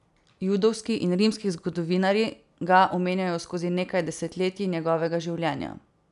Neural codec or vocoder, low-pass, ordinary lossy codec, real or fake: none; 10.8 kHz; none; real